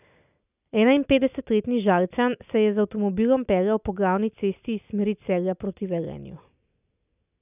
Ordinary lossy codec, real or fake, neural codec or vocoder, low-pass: none; real; none; 3.6 kHz